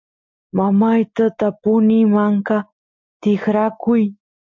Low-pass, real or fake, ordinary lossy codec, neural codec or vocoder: 7.2 kHz; real; MP3, 48 kbps; none